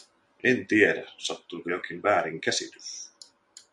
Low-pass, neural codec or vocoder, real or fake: 10.8 kHz; none; real